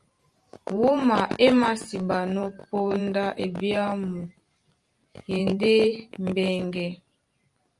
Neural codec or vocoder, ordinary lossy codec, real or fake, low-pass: vocoder, 44.1 kHz, 128 mel bands every 512 samples, BigVGAN v2; Opus, 32 kbps; fake; 10.8 kHz